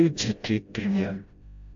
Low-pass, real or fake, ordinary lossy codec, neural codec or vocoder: 7.2 kHz; fake; AAC, 64 kbps; codec, 16 kHz, 0.5 kbps, FreqCodec, smaller model